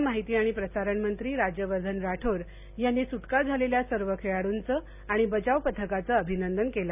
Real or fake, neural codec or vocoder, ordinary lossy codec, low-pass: real; none; none; 3.6 kHz